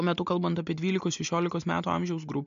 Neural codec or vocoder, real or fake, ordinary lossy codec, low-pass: none; real; MP3, 48 kbps; 7.2 kHz